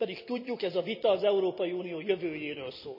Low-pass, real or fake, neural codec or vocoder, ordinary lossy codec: 5.4 kHz; real; none; none